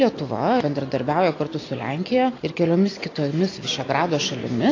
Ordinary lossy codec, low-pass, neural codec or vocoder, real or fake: AAC, 32 kbps; 7.2 kHz; none; real